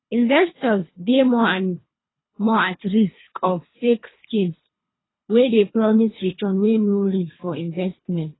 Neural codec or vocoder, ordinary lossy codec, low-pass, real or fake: codec, 24 kHz, 3 kbps, HILCodec; AAC, 16 kbps; 7.2 kHz; fake